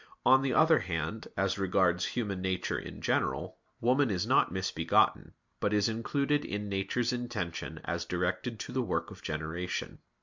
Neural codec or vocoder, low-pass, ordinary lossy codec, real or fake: none; 7.2 kHz; MP3, 64 kbps; real